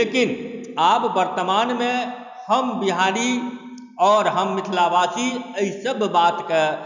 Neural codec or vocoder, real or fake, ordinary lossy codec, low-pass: none; real; none; 7.2 kHz